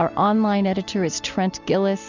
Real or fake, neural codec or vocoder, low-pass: real; none; 7.2 kHz